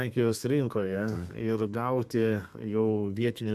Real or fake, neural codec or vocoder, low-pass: fake; codec, 32 kHz, 1.9 kbps, SNAC; 14.4 kHz